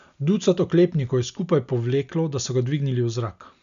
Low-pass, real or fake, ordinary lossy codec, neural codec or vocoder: 7.2 kHz; real; none; none